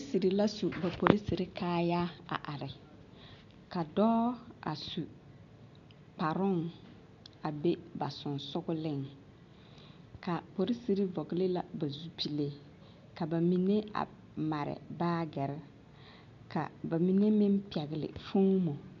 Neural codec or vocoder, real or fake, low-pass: none; real; 7.2 kHz